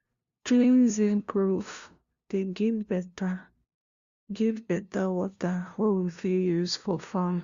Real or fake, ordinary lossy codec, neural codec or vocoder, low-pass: fake; Opus, 64 kbps; codec, 16 kHz, 0.5 kbps, FunCodec, trained on LibriTTS, 25 frames a second; 7.2 kHz